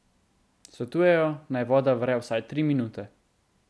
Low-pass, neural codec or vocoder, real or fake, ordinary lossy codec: none; none; real; none